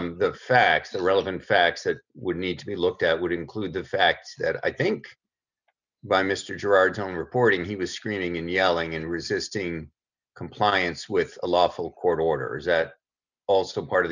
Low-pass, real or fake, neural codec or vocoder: 7.2 kHz; real; none